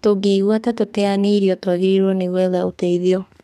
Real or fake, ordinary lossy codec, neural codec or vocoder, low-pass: fake; none; codec, 32 kHz, 1.9 kbps, SNAC; 14.4 kHz